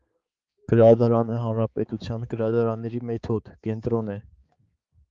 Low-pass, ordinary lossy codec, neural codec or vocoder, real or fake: 7.2 kHz; Opus, 32 kbps; codec, 16 kHz, 4 kbps, X-Codec, HuBERT features, trained on balanced general audio; fake